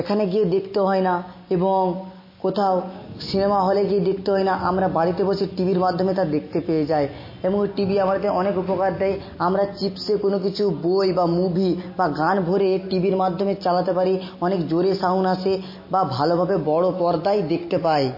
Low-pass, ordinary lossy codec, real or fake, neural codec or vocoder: 5.4 kHz; MP3, 24 kbps; real; none